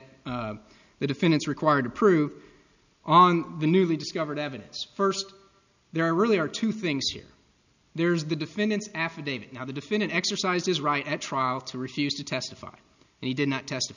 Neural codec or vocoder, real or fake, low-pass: none; real; 7.2 kHz